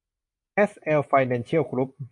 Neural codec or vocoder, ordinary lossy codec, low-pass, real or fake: none; MP3, 48 kbps; 10.8 kHz; real